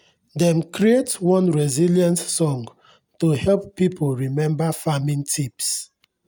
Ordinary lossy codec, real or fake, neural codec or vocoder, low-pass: none; real; none; none